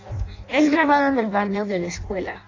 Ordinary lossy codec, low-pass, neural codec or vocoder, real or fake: AAC, 32 kbps; 7.2 kHz; codec, 16 kHz in and 24 kHz out, 0.6 kbps, FireRedTTS-2 codec; fake